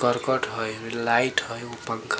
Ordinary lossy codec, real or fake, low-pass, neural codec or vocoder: none; real; none; none